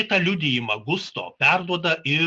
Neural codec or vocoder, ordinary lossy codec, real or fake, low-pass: none; Opus, 64 kbps; real; 10.8 kHz